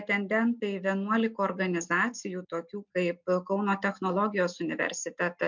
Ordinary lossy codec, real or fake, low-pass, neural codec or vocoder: MP3, 64 kbps; real; 7.2 kHz; none